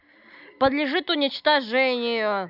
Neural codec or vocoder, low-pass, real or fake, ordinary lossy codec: none; 5.4 kHz; real; none